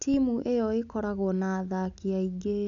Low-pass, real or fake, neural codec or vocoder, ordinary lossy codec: 7.2 kHz; real; none; none